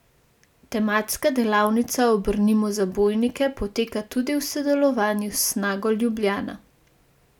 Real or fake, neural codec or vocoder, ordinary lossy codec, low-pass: real; none; none; 19.8 kHz